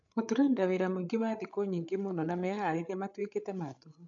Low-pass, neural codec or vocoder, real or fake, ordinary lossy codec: 7.2 kHz; codec, 16 kHz, 8 kbps, FreqCodec, larger model; fake; MP3, 64 kbps